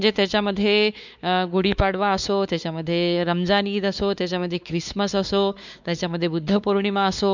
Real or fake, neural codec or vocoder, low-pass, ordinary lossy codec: fake; codec, 16 kHz, 4 kbps, X-Codec, WavLM features, trained on Multilingual LibriSpeech; 7.2 kHz; none